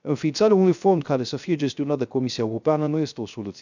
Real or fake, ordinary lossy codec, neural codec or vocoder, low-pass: fake; none; codec, 16 kHz, 0.3 kbps, FocalCodec; 7.2 kHz